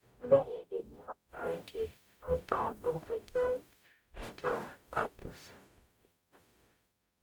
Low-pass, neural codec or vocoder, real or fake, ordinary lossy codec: none; codec, 44.1 kHz, 0.9 kbps, DAC; fake; none